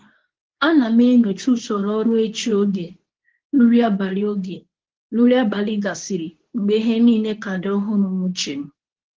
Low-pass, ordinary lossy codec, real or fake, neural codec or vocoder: 7.2 kHz; Opus, 16 kbps; fake; codec, 24 kHz, 0.9 kbps, WavTokenizer, medium speech release version 2